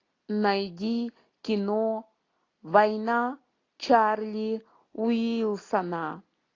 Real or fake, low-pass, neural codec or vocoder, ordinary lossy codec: real; 7.2 kHz; none; AAC, 32 kbps